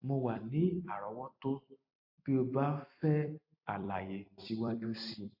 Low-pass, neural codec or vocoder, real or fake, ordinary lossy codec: 5.4 kHz; vocoder, 44.1 kHz, 128 mel bands every 256 samples, BigVGAN v2; fake; AAC, 48 kbps